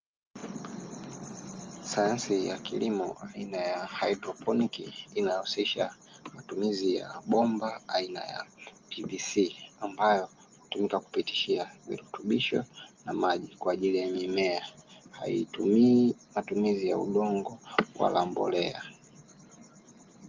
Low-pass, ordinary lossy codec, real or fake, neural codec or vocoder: 7.2 kHz; Opus, 32 kbps; real; none